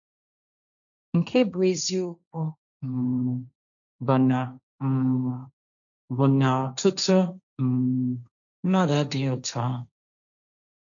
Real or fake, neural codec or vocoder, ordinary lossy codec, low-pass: fake; codec, 16 kHz, 1.1 kbps, Voila-Tokenizer; none; 7.2 kHz